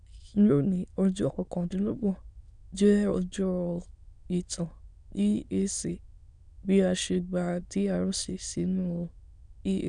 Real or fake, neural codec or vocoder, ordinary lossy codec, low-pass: fake; autoencoder, 22.05 kHz, a latent of 192 numbers a frame, VITS, trained on many speakers; none; 9.9 kHz